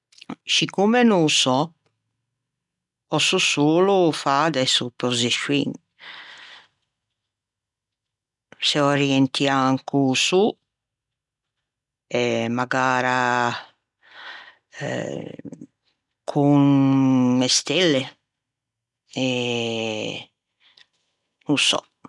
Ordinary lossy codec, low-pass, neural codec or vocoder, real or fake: none; 10.8 kHz; none; real